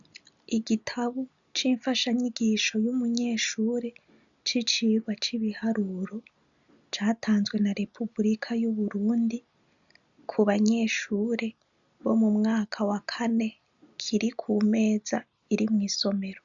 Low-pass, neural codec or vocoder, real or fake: 7.2 kHz; none; real